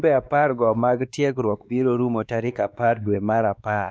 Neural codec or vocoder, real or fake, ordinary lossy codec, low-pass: codec, 16 kHz, 2 kbps, X-Codec, WavLM features, trained on Multilingual LibriSpeech; fake; none; none